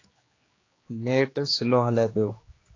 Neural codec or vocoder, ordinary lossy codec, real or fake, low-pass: codec, 16 kHz, 2 kbps, X-Codec, HuBERT features, trained on general audio; AAC, 32 kbps; fake; 7.2 kHz